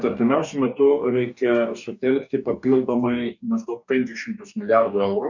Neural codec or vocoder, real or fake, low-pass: codec, 44.1 kHz, 2.6 kbps, DAC; fake; 7.2 kHz